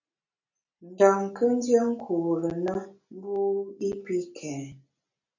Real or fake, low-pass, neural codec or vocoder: real; 7.2 kHz; none